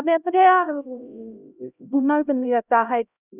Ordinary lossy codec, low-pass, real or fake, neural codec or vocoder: none; 3.6 kHz; fake; codec, 16 kHz, 0.5 kbps, X-Codec, HuBERT features, trained on LibriSpeech